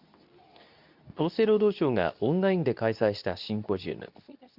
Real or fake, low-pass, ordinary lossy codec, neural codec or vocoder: fake; 5.4 kHz; none; codec, 24 kHz, 0.9 kbps, WavTokenizer, medium speech release version 2